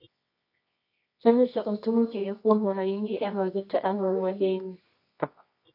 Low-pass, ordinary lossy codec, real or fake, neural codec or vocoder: 5.4 kHz; AAC, 32 kbps; fake; codec, 24 kHz, 0.9 kbps, WavTokenizer, medium music audio release